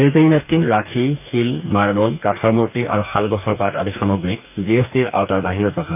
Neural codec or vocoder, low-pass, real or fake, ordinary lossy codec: codec, 44.1 kHz, 2.6 kbps, DAC; 3.6 kHz; fake; none